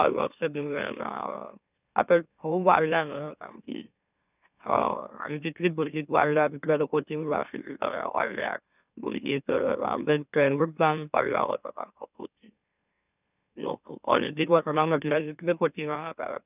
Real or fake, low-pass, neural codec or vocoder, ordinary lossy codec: fake; 3.6 kHz; autoencoder, 44.1 kHz, a latent of 192 numbers a frame, MeloTTS; none